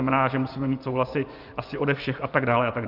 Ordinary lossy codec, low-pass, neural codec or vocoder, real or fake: Opus, 32 kbps; 5.4 kHz; vocoder, 24 kHz, 100 mel bands, Vocos; fake